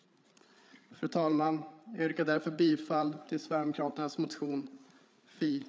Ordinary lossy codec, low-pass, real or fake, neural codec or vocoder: none; none; fake; codec, 16 kHz, 8 kbps, FreqCodec, larger model